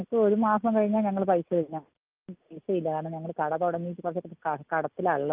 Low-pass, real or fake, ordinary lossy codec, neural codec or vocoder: 3.6 kHz; real; Opus, 24 kbps; none